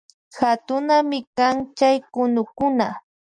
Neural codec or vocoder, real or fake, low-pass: none; real; 9.9 kHz